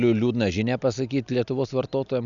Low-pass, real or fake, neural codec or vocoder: 7.2 kHz; real; none